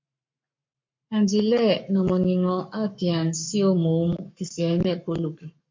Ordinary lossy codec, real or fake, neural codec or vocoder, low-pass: MP3, 48 kbps; fake; codec, 44.1 kHz, 7.8 kbps, Pupu-Codec; 7.2 kHz